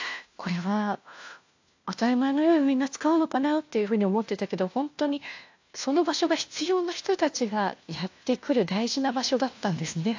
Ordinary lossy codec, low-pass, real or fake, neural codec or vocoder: AAC, 48 kbps; 7.2 kHz; fake; codec, 16 kHz, 1 kbps, FunCodec, trained on LibriTTS, 50 frames a second